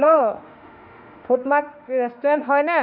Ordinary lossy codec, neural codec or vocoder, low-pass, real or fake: none; autoencoder, 48 kHz, 32 numbers a frame, DAC-VAE, trained on Japanese speech; 5.4 kHz; fake